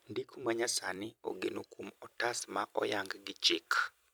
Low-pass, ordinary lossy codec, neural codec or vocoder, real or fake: none; none; vocoder, 44.1 kHz, 128 mel bands every 256 samples, BigVGAN v2; fake